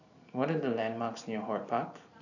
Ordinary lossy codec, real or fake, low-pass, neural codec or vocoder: none; fake; 7.2 kHz; vocoder, 44.1 kHz, 128 mel bands every 512 samples, BigVGAN v2